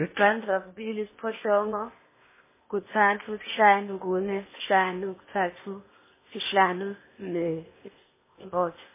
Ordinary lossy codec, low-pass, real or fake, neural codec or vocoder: MP3, 16 kbps; 3.6 kHz; fake; codec, 16 kHz in and 24 kHz out, 0.8 kbps, FocalCodec, streaming, 65536 codes